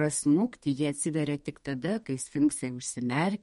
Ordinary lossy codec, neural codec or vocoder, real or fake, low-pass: MP3, 48 kbps; codec, 32 kHz, 1.9 kbps, SNAC; fake; 14.4 kHz